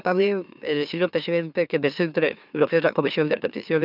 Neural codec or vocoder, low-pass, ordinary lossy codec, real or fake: autoencoder, 44.1 kHz, a latent of 192 numbers a frame, MeloTTS; 5.4 kHz; none; fake